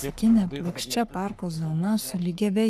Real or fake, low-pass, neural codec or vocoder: fake; 14.4 kHz; codec, 44.1 kHz, 3.4 kbps, Pupu-Codec